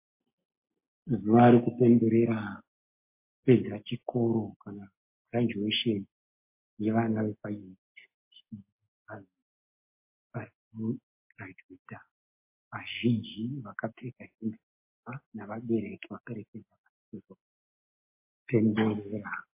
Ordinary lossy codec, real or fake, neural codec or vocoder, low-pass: MP3, 24 kbps; real; none; 3.6 kHz